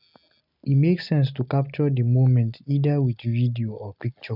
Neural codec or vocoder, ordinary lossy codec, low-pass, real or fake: none; none; 5.4 kHz; real